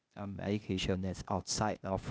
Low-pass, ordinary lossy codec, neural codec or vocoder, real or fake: none; none; codec, 16 kHz, 0.8 kbps, ZipCodec; fake